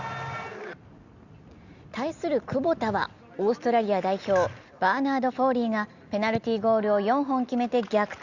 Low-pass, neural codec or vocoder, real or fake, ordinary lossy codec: 7.2 kHz; none; real; Opus, 64 kbps